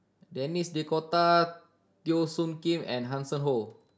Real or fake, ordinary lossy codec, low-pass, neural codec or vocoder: real; none; none; none